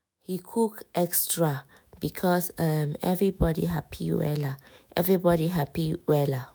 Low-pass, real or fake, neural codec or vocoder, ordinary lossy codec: none; fake; autoencoder, 48 kHz, 128 numbers a frame, DAC-VAE, trained on Japanese speech; none